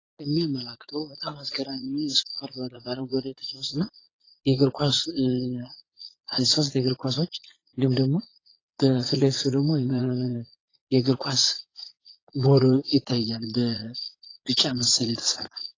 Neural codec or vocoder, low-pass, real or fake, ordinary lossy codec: vocoder, 22.05 kHz, 80 mel bands, Vocos; 7.2 kHz; fake; AAC, 32 kbps